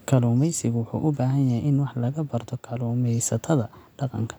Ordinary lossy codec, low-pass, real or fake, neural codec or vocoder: none; none; real; none